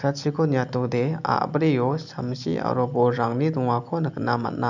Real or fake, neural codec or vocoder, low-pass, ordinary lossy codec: real; none; 7.2 kHz; none